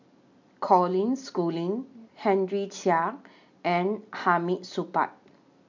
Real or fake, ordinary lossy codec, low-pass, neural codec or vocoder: real; MP3, 64 kbps; 7.2 kHz; none